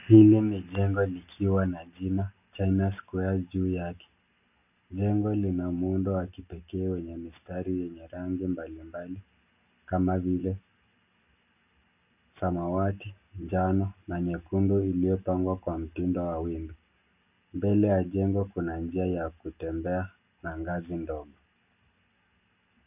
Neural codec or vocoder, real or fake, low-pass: none; real; 3.6 kHz